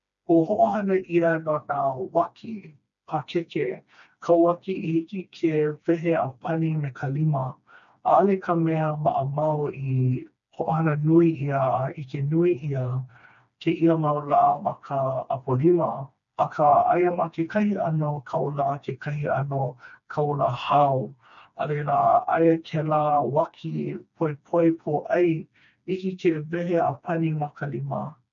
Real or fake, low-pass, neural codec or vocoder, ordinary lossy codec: fake; 7.2 kHz; codec, 16 kHz, 2 kbps, FreqCodec, smaller model; none